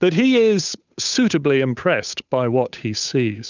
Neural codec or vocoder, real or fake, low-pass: codec, 16 kHz, 8 kbps, FunCodec, trained on Chinese and English, 25 frames a second; fake; 7.2 kHz